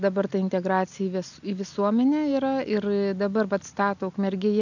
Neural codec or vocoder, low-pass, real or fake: none; 7.2 kHz; real